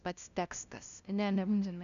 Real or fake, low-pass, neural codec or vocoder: fake; 7.2 kHz; codec, 16 kHz, 0.5 kbps, FunCodec, trained on LibriTTS, 25 frames a second